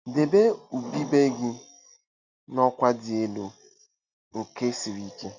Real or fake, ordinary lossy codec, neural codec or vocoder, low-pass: real; Opus, 64 kbps; none; 7.2 kHz